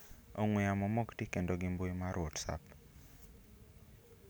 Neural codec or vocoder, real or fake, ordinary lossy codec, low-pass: none; real; none; none